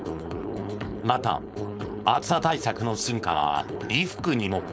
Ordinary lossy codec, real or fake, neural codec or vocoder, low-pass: none; fake; codec, 16 kHz, 4.8 kbps, FACodec; none